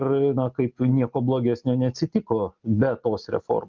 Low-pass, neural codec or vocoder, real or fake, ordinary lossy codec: 7.2 kHz; none; real; Opus, 24 kbps